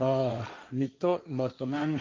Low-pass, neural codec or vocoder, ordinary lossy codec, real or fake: 7.2 kHz; codec, 16 kHz, 1.1 kbps, Voila-Tokenizer; Opus, 24 kbps; fake